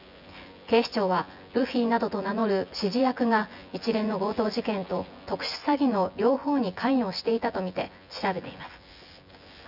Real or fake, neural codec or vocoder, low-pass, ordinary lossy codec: fake; vocoder, 24 kHz, 100 mel bands, Vocos; 5.4 kHz; none